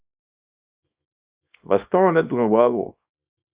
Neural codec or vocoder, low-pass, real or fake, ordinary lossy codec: codec, 24 kHz, 0.9 kbps, WavTokenizer, small release; 3.6 kHz; fake; Opus, 64 kbps